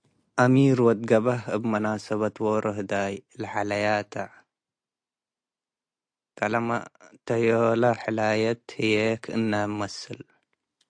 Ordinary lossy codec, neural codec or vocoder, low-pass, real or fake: AAC, 64 kbps; none; 9.9 kHz; real